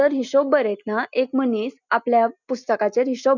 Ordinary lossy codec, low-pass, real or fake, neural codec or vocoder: none; 7.2 kHz; real; none